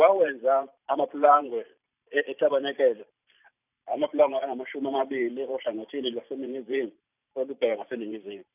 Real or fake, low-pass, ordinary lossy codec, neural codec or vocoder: fake; 3.6 kHz; none; vocoder, 44.1 kHz, 128 mel bands every 512 samples, BigVGAN v2